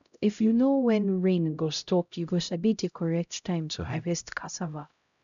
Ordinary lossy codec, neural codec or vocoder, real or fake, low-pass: none; codec, 16 kHz, 0.5 kbps, X-Codec, HuBERT features, trained on LibriSpeech; fake; 7.2 kHz